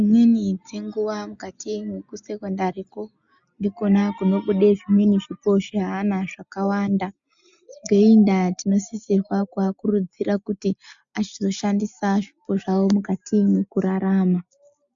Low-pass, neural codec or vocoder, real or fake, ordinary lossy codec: 7.2 kHz; none; real; MP3, 64 kbps